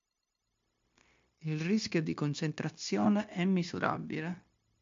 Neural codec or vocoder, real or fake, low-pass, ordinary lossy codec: codec, 16 kHz, 0.9 kbps, LongCat-Audio-Codec; fake; 7.2 kHz; MP3, 48 kbps